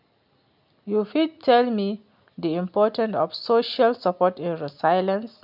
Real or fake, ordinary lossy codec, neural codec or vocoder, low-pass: real; none; none; 5.4 kHz